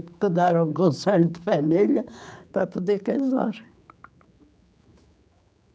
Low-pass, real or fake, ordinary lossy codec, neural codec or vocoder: none; fake; none; codec, 16 kHz, 4 kbps, X-Codec, HuBERT features, trained on general audio